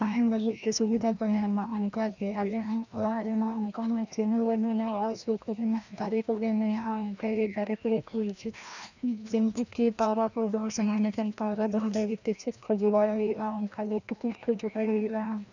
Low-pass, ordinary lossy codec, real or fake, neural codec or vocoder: 7.2 kHz; none; fake; codec, 16 kHz, 1 kbps, FreqCodec, larger model